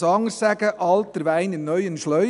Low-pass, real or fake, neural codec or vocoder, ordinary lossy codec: 10.8 kHz; real; none; none